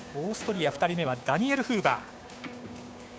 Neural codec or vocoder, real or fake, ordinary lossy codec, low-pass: codec, 16 kHz, 6 kbps, DAC; fake; none; none